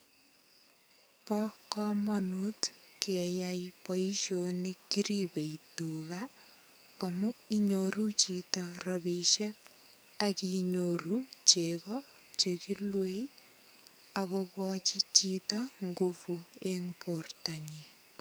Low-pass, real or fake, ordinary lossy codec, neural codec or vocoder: none; fake; none; codec, 44.1 kHz, 2.6 kbps, SNAC